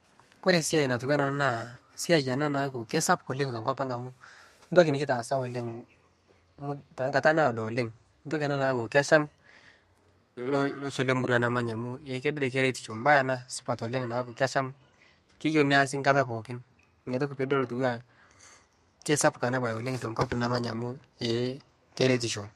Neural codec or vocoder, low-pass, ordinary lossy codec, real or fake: codec, 32 kHz, 1.9 kbps, SNAC; 14.4 kHz; MP3, 64 kbps; fake